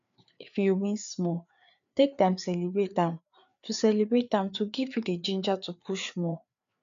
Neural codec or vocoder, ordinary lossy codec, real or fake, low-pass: codec, 16 kHz, 4 kbps, FreqCodec, larger model; none; fake; 7.2 kHz